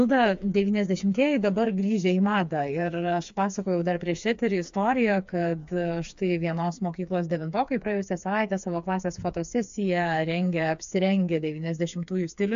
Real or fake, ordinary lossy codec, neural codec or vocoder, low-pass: fake; AAC, 64 kbps; codec, 16 kHz, 4 kbps, FreqCodec, smaller model; 7.2 kHz